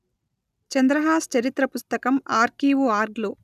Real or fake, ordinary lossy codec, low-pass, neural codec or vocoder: real; none; 14.4 kHz; none